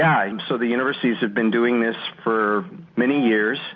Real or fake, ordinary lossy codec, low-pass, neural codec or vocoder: real; MP3, 48 kbps; 7.2 kHz; none